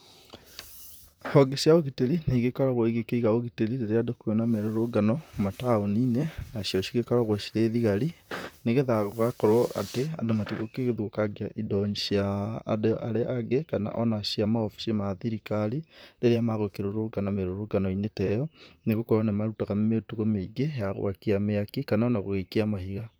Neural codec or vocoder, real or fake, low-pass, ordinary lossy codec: vocoder, 44.1 kHz, 128 mel bands, Pupu-Vocoder; fake; none; none